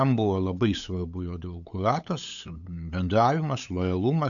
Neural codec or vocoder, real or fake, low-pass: codec, 16 kHz, 8 kbps, FunCodec, trained on LibriTTS, 25 frames a second; fake; 7.2 kHz